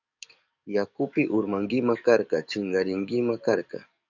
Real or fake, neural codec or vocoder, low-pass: fake; codec, 44.1 kHz, 7.8 kbps, DAC; 7.2 kHz